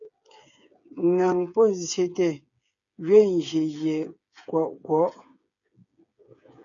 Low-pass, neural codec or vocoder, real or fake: 7.2 kHz; codec, 16 kHz, 8 kbps, FreqCodec, smaller model; fake